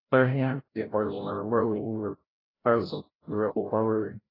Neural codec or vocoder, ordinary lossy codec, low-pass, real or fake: codec, 16 kHz, 0.5 kbps, FreqCodec, larger model; AAC, 24 kbps; 5.4 kHz; fake